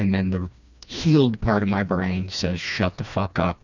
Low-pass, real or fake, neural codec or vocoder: 7.2 kHz; fake; codec, 16 kHz, 2 kbps, FreqCodec, smaller model